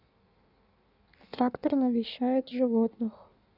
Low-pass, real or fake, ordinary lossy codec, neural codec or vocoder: 5.4 kHz; fake; none; codec, 16 kHz in and 24 kHz out, 1.1 kbps, FireRedTTS-2 codec